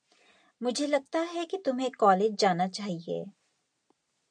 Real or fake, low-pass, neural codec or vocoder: real; 9.9 kHz; none